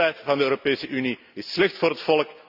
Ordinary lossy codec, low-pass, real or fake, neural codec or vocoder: none; 5.4 kHz; real; none